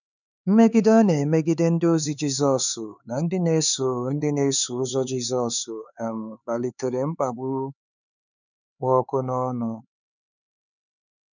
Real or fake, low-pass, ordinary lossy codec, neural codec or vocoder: fake; 7.2 kHz; none; codec, 16 kHz, 4 kbps, X-Codec, HuBERT features, trained on LibriSpeech